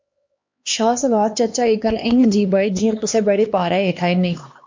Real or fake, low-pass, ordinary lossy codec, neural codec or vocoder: fake; 7.2 kHz; MP3, 48 kbps; codec, 16 kHz, 2 kbps, X-Codec, HuBERT features, trained on LibriSpeech